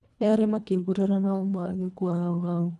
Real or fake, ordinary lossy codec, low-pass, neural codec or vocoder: fake; none; none; codec, 24 kHz, 1.5 kbps, HILCodec